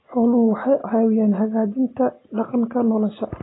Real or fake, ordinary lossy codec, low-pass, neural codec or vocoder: real; AAC, 16 kbps; 7.2 kHz; none